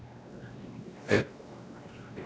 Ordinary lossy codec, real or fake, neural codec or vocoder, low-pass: none; fake; codec, 16 kHz, 1 kbps, X-Codec, WavLM features, trained on Multilingual LibriSpeech; none